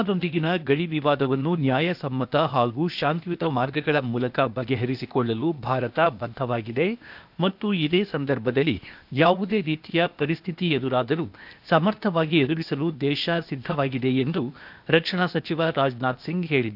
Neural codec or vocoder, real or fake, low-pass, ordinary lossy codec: codec, 16 kHz, 0.8 kbps, ZipCodec; fake; 5.4 kHz; none